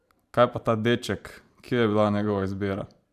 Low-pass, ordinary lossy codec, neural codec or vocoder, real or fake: 14.4 kHz; AAC, 96 kbps; vocoder, 44.1 kHz, 128 mel bands every 512 samples, BigVGAN v2; fake